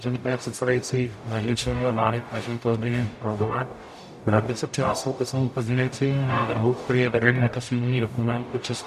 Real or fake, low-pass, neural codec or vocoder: fake; 14.4 kHz; codec, 44.1 kHz, 0.9 kbps, DAC